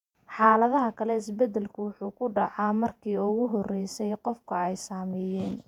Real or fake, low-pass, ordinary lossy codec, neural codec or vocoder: fake; 19.8 kHz; none; vocoder, 48 kHz, 128 mel bands, Vocos